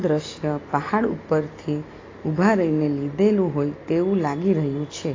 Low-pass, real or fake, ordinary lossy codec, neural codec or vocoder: 7.2 kHz; real; AAC, 32 kbps; none